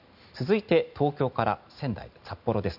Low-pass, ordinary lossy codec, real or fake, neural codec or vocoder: 5.4 kHz; none; real; none